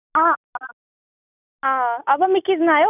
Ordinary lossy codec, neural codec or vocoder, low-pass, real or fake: none; none; 3.6 kHz; real